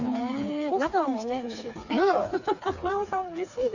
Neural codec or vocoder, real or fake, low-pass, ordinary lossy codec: codec, 16 kHz, 4 kbps, FreqCodec, smaller model; fake; 7.2 kHz; none